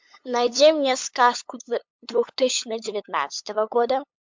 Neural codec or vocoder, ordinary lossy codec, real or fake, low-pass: codec, 16 kHz, 8 kbps, FunCodec, trained on LibriTTS, 25 frames a second; MP3, 64 kbps; fake; 7.2 kHz